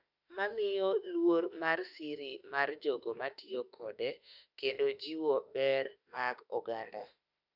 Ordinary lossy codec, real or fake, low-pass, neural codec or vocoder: none; fake; 5.4 kHz; autoencoder, 48 kHz, 32 numbers a frame, DAC-VAE, trained on Japanese speech